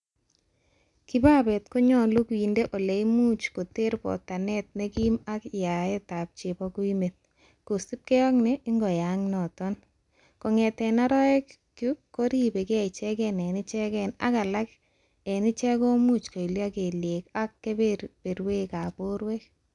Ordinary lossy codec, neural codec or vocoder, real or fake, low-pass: MP3, 96 kbps; none; real; 10.8 kHz